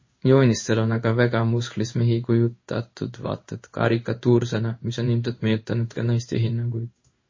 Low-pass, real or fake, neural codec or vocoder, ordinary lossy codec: 7.2 kHz; fake; codec, 16 kHz in and 24 kHz out, 1 kbps, XY-Tokenizer; MP3, 32 kbps